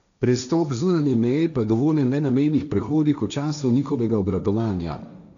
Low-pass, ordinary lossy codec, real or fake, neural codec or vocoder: 7.2 kHz; none; fake; codec, 16 kHz, 1.1 kbps, Voila-Tokenizer